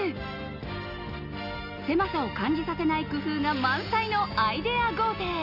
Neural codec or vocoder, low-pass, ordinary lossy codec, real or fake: none; 5.4 kHz; none; real